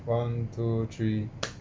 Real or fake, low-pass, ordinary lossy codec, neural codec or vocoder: real; none; none; none